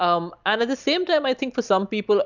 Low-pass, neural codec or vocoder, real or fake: 7.2 kHz; none; real